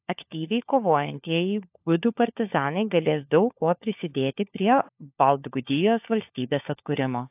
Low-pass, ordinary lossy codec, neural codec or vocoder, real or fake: 3.6 kHz; AAC, 32 kbps; codec, 16 kHz, 4 kbps, FunCodec, trained on LibriTTS, 50 frames a second; fake